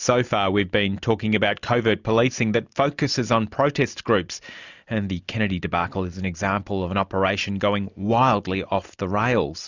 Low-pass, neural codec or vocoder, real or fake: 7.2 kHz; none; real